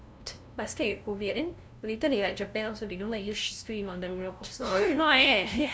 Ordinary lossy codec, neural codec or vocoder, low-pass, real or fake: none; codec, 16 kHz, 0.5 kbps, FunCodec, trained on LibriTTS, 25 frames a second; none; fake